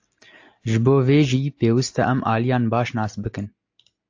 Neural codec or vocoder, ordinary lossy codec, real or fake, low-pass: none; AAC, 48 kbps; real; 7.2 kHz